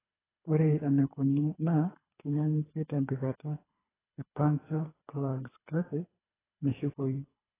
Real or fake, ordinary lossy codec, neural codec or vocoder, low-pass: fake; AAC, 16 kbps; codec, 24 kHz, 3 kbps, HILCodec; 3.6 kHz